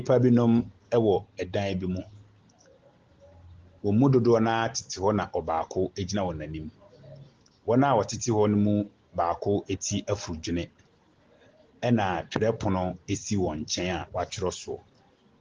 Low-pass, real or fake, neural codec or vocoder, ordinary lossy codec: 7.2 kHz; real; none; Opus, 16 kbps